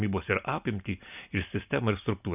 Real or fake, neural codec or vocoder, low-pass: real; none; 3.6 kHz